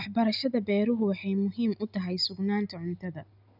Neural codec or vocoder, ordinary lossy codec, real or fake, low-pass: none; none; real; 5.4 kHz